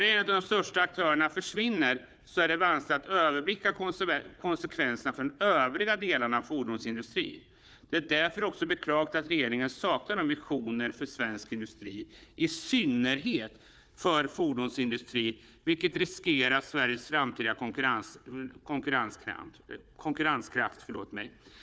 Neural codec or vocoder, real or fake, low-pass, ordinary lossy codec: codec, 16 kHz, 4 kbps, FunCodec, trained on Chinese and English, 50 frames a second; fake; none; none